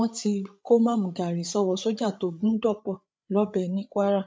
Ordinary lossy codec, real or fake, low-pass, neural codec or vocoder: none; fake; none; codec, 16 kHz, 8 kbps, FreqCodec, larger model